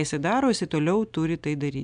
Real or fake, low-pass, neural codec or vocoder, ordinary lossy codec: real; 9.9 kHz; none; MP3, 96 kbps